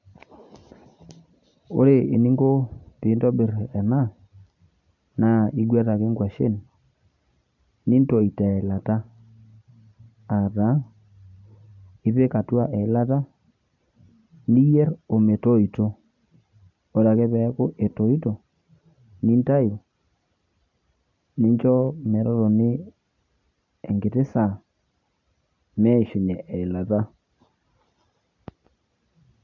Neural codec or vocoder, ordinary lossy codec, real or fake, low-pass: none; none; real; 7.2 kHz